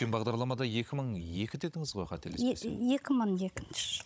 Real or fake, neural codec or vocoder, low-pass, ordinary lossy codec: fake; codec, 16 kHz, 16 kbps, FunCodec, trained on Chinese and English, 50 frames a second; none; none